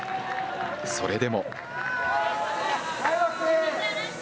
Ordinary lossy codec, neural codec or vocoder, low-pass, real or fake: none; none; none; real